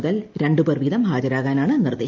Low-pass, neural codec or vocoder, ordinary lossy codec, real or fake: 7.2 kHz; none; Opus, 24 kbps; real